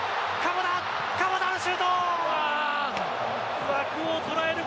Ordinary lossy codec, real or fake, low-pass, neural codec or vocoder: none; real; none; none